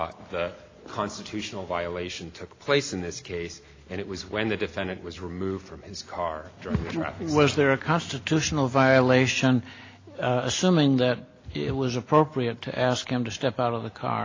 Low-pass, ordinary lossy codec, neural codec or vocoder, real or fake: 7.2 kHz; AAC, 32 kbps; none; real